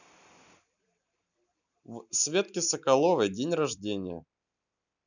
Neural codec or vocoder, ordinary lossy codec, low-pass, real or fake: vocoder, 44.1 kHz, 128 mel bands every 256 samples, BigVGAN v2; none; 7.2 kHz; fake